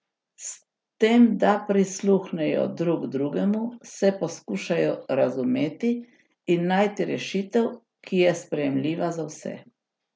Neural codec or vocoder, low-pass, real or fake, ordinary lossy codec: none; none; real; none